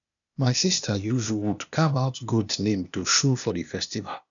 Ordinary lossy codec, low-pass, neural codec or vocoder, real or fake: none; 7.2 kHz; codec, 16 kHz, 0.8 kbps, ZipCodec; fake